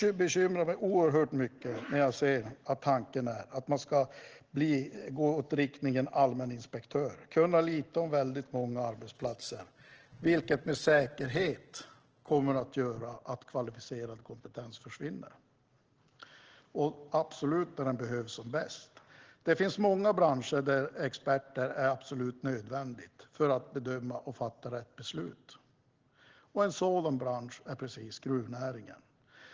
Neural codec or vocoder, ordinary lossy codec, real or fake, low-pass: none; Opus, 16 kbps; real; 7.2 kHz